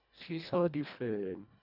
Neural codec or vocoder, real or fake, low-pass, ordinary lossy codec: codec, 24 kHz, 1.5 kbps, HILCodec; fake; 5.4 kHz; none